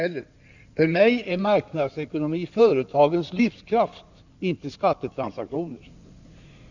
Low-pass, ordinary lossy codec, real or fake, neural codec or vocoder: 7.2 kHz; none; fake; codec, 16 kHz in and 24 kHz out, 2.2 kbps, FireRedTTS-2 codec